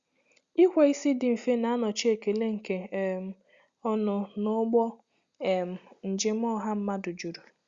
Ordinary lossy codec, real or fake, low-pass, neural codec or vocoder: Opus, 64 kbps; real; 7.2 kHz; none